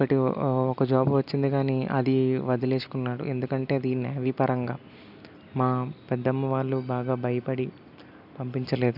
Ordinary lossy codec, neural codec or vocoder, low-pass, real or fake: none; none; 5.4 kHz; real